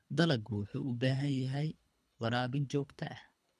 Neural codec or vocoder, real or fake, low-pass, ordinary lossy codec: codec, 24 kHz, 3 kbps, HILCodec; fake; none; none